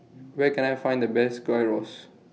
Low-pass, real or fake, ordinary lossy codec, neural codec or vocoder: none; real; none; none